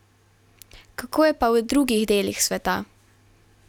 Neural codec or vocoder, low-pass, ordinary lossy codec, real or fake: none; 19.8 kHz; none; real